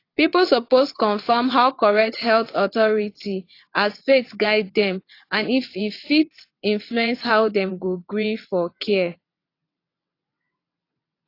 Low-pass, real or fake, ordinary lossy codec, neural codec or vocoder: 5.4 kHz; fake; AAC, 32 kbps; vocoder, 22.05 kHz, 80 mel bands, WaveNeXt